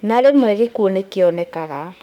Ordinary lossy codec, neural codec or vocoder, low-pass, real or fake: none; autoencoder, 48 kHz, 32 numbers a frame, DAC-VAE, trained on Japanese speech; 19.8 kHz; fake